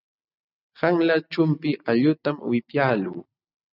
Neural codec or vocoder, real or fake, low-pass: none; real; 5.4 kHz